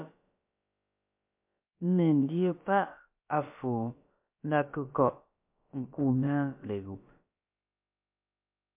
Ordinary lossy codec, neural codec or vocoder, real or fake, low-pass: MP3, 32 kbps; codec, 16 kHz, about 1 kbps, DyCAST, with the encoder's durations; fake; 3.6 kHz